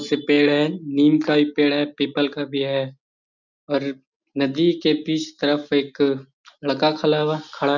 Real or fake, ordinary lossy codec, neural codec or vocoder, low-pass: real; none; none; 7.2 kHz